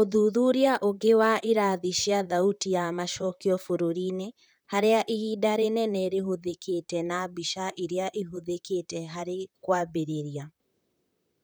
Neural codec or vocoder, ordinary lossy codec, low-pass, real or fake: vocoder, 44.1 kHz, 128 mel bands, Pupu-Vocoder; none; none; fake